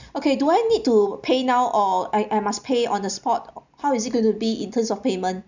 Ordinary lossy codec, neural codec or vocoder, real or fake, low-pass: none; none; real; 7.2 kHz